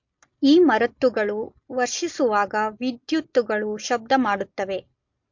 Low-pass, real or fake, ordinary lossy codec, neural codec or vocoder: 7.2 kHz; real; MP3, 48 kbps; none